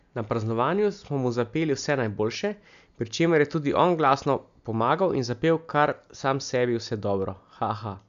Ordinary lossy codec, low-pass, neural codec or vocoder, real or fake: AAC, 96 kbps; 7.2 kHz; none; real